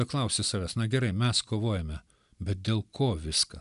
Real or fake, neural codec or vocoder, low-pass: real; none; 10.8 kHz